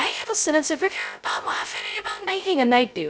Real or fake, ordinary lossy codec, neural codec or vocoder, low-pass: fake; none; codec, 16 kHz, 0.2 kbps, FocalCodec; none